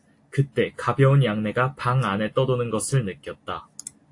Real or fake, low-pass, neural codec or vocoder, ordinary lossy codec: real; 10.8 kHz; none; AAC, 48 kbps